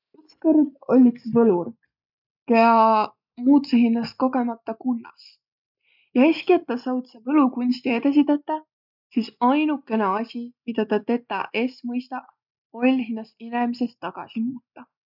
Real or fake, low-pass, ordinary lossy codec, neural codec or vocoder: real; 5.4 kHz; none; none